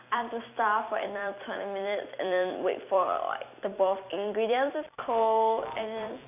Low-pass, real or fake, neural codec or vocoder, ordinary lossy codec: 3.6 kHz; fake; vocoder, 44.1 kHz, 128 mel bands every 256 samples, BigVGAN v2; none